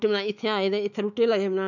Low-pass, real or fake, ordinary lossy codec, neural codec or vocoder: 7.2 kHz; fake; none; autoencoder, 48 kHz, 128 numbers a frame, DAC-VAE, trained on Japanese speech